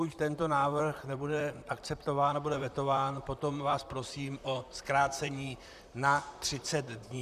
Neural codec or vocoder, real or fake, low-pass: vocoder, 44.1 kHz, 128 mel bands, Pupu-Vocoder; fake; 14.4 kHz